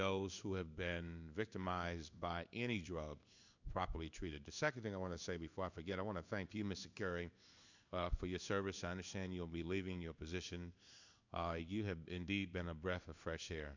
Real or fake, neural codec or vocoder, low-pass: fake; codec, 16 kHz in and 24 kHz out, 1 kbps, XY-Tokenizer; 7.2 kHz